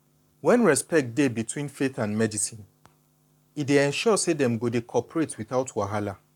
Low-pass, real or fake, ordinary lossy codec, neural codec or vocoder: 19.8 kHz; fake; none; codec, 44.1 kHz, 7.8 kbps, Pupu-Codec